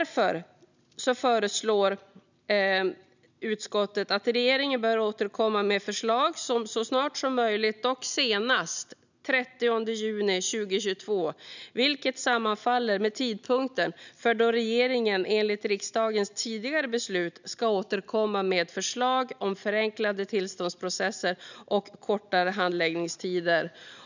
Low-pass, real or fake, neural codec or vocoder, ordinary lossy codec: 7.2 kHz; real; none; none